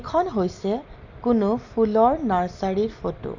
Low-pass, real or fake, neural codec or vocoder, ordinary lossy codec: 7.2 kHz; real; none; none